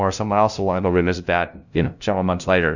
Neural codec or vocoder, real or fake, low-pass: codec, 16 kHz, 0.5 kbps, FunCodec, trained on LibriTTS, 25 frames a second; fake; 7.2 kHz